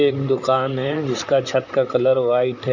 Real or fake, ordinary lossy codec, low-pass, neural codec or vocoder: fake; none; 7.2 kHz; vocoder, 44.1 kHz, 128 mel bands, Pupu-Vocoder